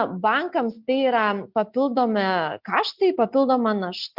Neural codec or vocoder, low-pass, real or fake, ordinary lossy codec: none; 5.4 kHz; real; Opus, 64 kbps